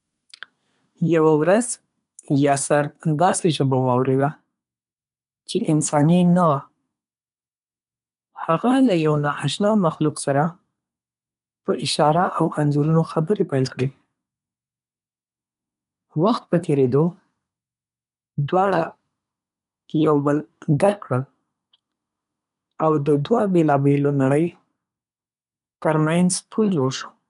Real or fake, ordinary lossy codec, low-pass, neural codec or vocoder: fake; none; 10.8 kHz; codec, 24 kHz, 1 kbps, SNAC